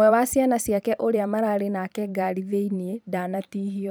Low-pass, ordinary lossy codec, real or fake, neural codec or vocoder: none; none; fake; vocoder, 44.1 kHz, 128 mel bands every 512 samples, BigVGAN v2